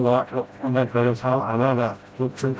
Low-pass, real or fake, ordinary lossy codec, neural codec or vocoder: none; fake; none; codec, 16 kHz, 0.5 kbps, FreqCodec, smaller model